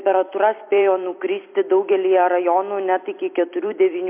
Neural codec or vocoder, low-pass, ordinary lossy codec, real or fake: vocoder, 44.1 kHz, 128 mel bands every 256 samples, BigVGAN v2; 3.6 kHz; MP3, 32 kbps; fake